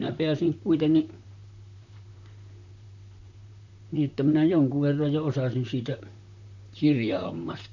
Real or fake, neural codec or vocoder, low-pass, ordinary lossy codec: fake; vocoder, 44.1 kHz, 128 mel bands, Pupu-Vocoder; 7.2 kHz; none